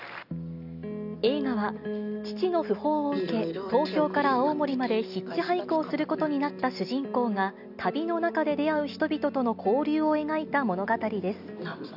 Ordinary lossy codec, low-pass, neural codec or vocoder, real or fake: none; 5.4 kHz; none; real